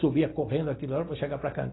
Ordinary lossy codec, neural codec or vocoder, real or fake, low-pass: AAC, 16 kbps; none; real; 7.2 kHz